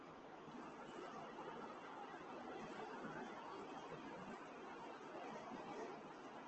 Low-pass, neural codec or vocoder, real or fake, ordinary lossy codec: 7.2 kHz; none; real; Opus, 16 kbps